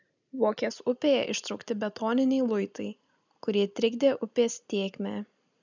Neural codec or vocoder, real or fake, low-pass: none; real; 7.2 kHz